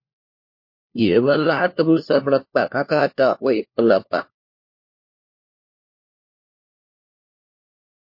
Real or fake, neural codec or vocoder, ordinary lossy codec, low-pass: fake; codec, 16 kHz, 1 kbps, FunCodec, trained on LibriTTS, 50 frames a second; MP3, 32 kbps; 5.4 kHz